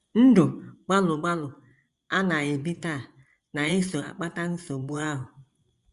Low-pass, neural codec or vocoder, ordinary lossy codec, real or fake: 10.8 kHz; vocoder, 24 kHz, 100 mel bands, Vocos; Opus, 64 kbps; fake